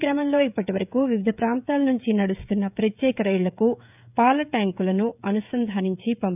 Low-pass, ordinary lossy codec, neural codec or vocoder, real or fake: 3.6 kHz; none; codec, 16 kHz, 16 kbps, FreqCodec, smaller model; fake